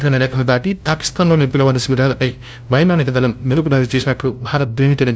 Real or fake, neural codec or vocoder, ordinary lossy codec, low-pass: fake; codec, 16 kHz, 0.5 kbps, FunCodec, trained on LibriTTS, 25 frames a second; none; none